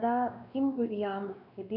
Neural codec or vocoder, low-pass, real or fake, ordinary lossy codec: codec, 16 kHz, 2 kbps, X-Codec, WavLM features, trained on Multilingual LibriSpeech; 5.4 kHz; fake; none